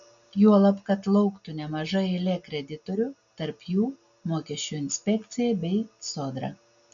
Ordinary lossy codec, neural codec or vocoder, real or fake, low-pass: MP3, 96 kbps; none; real; 7.2 kHz